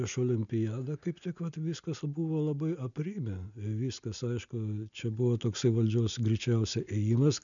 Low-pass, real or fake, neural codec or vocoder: 7.2 kHz; real; none